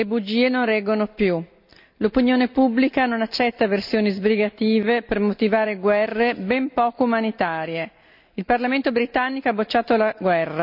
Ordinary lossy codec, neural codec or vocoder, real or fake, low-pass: none; none; real; 5.4 kHz